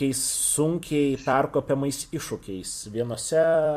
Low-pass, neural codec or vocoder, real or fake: 14.4 kHz; vocoder, 44.1 kHz, 128 mel bands every 256 samples, BigVGAN v2; fake